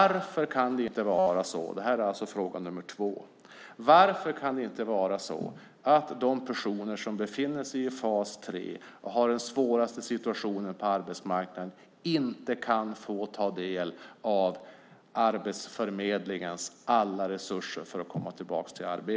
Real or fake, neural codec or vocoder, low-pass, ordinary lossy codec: real; none; none; none